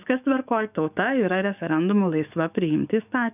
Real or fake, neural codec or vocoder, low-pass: fake; codec, 44.1 kHz, 7.8 kbps, DAC; 3.6 kHz